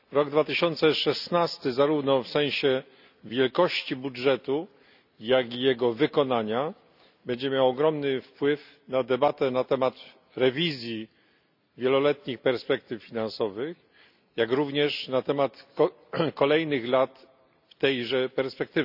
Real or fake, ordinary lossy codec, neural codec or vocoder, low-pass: real; none; none; 5.4 kHz